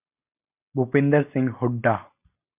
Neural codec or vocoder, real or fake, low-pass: none; real; 3.6 kHz